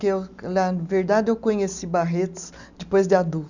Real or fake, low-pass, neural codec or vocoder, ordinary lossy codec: real; 7.2 kHz; none; none